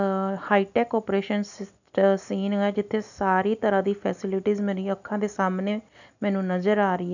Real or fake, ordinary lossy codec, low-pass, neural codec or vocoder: real; none; 7.2 kHz; none